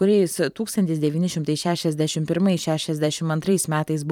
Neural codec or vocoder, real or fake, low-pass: vocoder, 44.1 kHz, 128 mel bands every 512 samples, BigVGAN v2; fake; 19.8 kHz